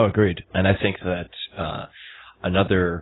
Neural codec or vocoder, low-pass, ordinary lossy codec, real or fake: none; 7.2 kHz; AAC, 16 kbps; real